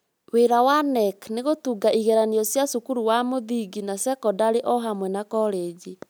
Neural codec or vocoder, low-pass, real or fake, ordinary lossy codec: none; none; real; none